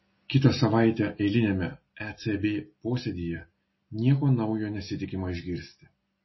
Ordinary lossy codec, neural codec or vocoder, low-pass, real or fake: MP3, 24 kbps; none; 7.2 kHz; real